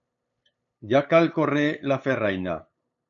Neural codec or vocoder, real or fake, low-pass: codec, 16 kHz, 8 kbps, FunCodec, trained on LibriTTS, 25 frames a second; fake; 7.2 kHz